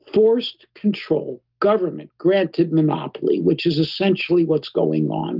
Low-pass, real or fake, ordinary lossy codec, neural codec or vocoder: 5.4 kHz; real; Opus, 32 kbps; none